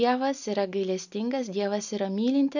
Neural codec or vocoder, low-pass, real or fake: none; 7.2 kHz; real